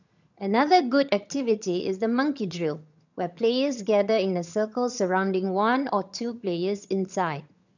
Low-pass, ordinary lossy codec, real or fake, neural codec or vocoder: 7.2 kHz; none; fake; vocoder, 22.05 kHz, 80 mel bands, HiFi-GAN